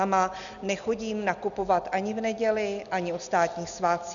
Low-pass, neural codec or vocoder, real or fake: 7.2 kHz; none; real